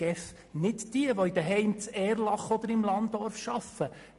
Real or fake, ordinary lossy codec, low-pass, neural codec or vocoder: real; MP3, 48 kbps; 14.4 kHz; none